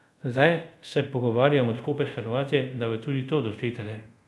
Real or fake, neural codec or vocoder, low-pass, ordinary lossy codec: fake; codec, 24 kHz, 0.5 kbps, DualCodec; none; none